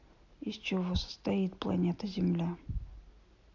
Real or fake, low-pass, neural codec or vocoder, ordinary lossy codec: real; 7.2 kHz; none; AAC, 48 kbps